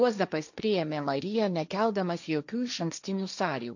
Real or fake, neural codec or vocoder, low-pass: fake; codec, 16 kHz, 1.1 kbps, Voila-Tokenizer; 7.2 kHz